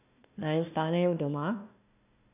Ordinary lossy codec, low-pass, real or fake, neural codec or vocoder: none; 3.6 kHz; fake; codec, 16 kHz, 1 kbps, FunCodec, trained on Chinese and English, 50 frames a second